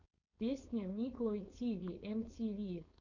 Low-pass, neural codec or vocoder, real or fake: 7.2 kHz; codec, 16 kHz, 4.8 kbps, FACodec; fake